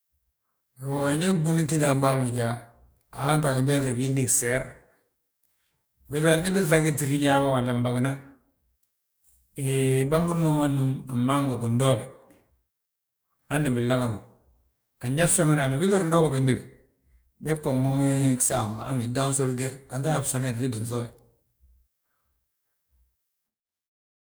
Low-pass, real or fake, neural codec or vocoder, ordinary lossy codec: none; fake; codec, 44.1 kHz, 2.6 kbps, DAC; none